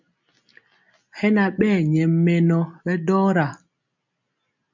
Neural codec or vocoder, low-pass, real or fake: none; 7.2 kHz; real